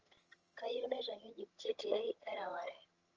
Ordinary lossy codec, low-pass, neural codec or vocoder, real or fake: Opus, 24 kbps; 7.2 kHz; vocoder, 22.05 kHz, 80 mel bands, HiFi-GAN; fake